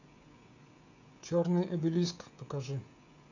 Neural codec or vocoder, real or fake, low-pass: codec, 16 kHz, 16 kbps, FreqCodec, smaller model; fake; 7.2 kHz